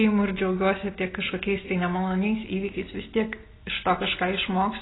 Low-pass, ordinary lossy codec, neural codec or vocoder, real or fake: 7.2 kHz; AAC, 16 kbps; none; real